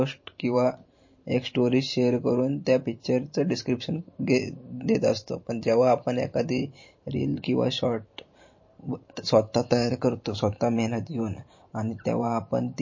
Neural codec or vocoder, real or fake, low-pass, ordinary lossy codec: vocoder, 44.1 kHz, 128 mel bands every 512 samples, BigVGAN v2; fake; 7.2 kHz; MP3, 32 kbps